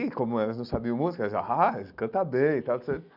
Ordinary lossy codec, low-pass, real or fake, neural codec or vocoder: none; 5.4 kHz; real; none